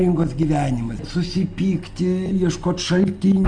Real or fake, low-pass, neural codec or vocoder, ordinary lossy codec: real; 9.9 kHz; none; Opus, 32 kbps